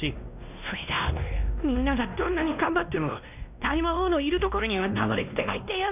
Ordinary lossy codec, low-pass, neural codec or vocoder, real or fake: none; 3.6 kHz; codec, 16 kHz, 1 kbps, X-Codec, WavLM features, trained on Multilingual LibriSpeech; fake